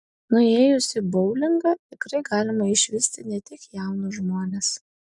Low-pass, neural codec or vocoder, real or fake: 10.8 kHz; none; real